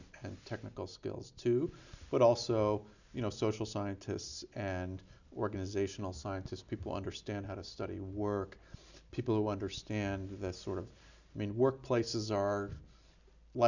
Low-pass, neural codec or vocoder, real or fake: 7.2 kHz; none; real